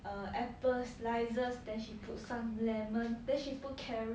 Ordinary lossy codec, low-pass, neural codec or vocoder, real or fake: none; none; none; real